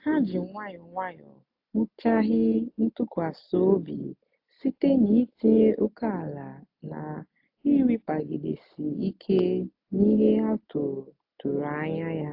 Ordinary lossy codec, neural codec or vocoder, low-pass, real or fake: AAC, 48 kbps; none; 5.4 kHz; real